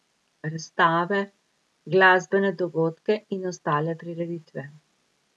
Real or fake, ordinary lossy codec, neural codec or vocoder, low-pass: real; none; none; none